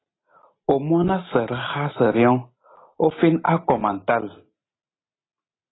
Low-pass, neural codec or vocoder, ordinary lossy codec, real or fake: 7.2 kHz; none; AAC, 16 kbps; real